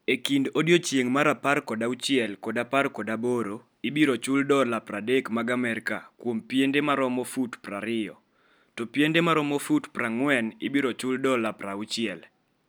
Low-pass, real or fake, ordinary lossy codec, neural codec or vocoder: none; real; none; none